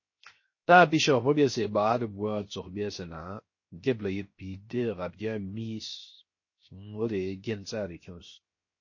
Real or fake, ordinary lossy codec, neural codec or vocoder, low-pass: fake; MP3, 32 kbps; codec, 16 kHz, 0.7 kbps, FocalCodec; 7.2 kHz